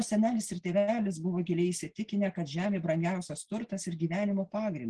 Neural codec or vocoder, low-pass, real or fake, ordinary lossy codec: none; 9.9 kHz; real; Opus, 16 kbps